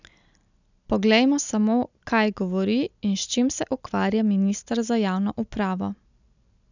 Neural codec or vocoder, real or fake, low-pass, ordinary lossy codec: none; real; 7.2 kHz; none